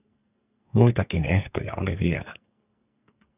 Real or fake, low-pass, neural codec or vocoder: fake; 3.6 kHz; codec, 44.1 kHz, 2.6 kbps, SNAC